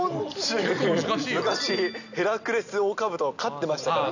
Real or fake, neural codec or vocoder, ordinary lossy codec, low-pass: real; none; none; 7.2 kHz